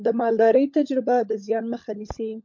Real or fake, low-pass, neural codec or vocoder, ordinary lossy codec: fake; 7.2 kHz; codec, 16 kHz, 16 kbps, FunCodec, trained on LibriTTS, 50 frames a second; MP3, 48 kbps